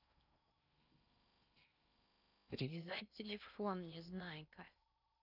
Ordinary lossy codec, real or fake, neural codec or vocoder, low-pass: none; fake; codec, 16 kHz in and 24 kHz out, 0.6 kbps, FocalCodec, streaming, 4096 codes; 5.4 kHz